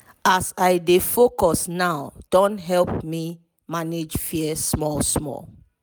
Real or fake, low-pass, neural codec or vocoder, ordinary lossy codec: real; none; none; none